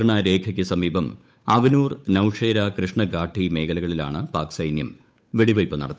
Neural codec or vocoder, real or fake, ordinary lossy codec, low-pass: codec, 16 kHz, 8 kbps, FunCodec, trained on Chinese and English, 25 frames a second; fake; none; none